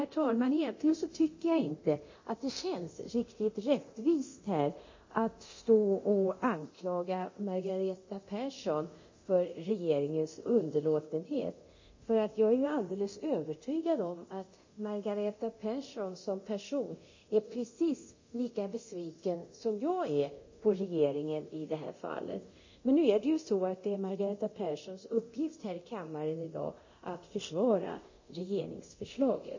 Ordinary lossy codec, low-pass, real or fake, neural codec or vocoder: MP3, 32 kbps; 7.2 kHz; fake; codec, 24 kHz, 0.9 kbps, DualCodec